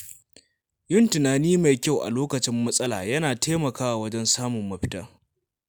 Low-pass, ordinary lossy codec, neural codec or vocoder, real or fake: none; none; none; real